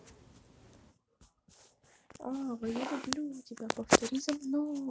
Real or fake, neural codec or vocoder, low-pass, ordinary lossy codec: real; none; none; none